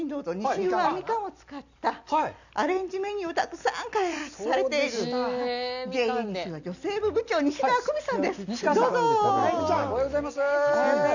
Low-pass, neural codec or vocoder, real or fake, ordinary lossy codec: 7.2 kHz; none; real; none